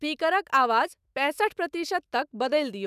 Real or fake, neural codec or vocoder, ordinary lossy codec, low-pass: fake; autoencoder, 48 kHz, 128 numbers a frame, DAC-VAE, trained on Japanese speech; none; 14.4 kHz